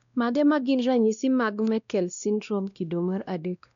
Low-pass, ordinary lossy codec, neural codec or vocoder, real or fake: 7.2 kHz; none; codec, 16 kHz, 1 kbps, X-Codec, WavLM features, trained on Multilingual LibriSpeech; fake